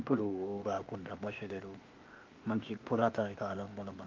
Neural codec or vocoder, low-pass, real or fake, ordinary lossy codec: codec, 16 kHz, 0.8 kbps, ZipCodec; 7.2 kHz; fake; Opus, 32 kbps